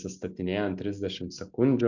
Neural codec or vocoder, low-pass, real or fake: none; 7.2 kHz; real